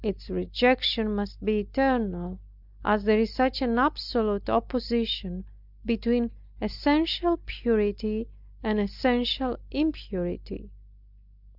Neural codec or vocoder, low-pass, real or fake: none; 5.4 kHz; real